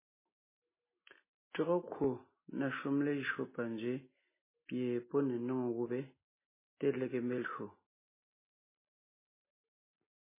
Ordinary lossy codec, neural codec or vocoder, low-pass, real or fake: MP3, 16 kbps; none; 3.6 kHz; real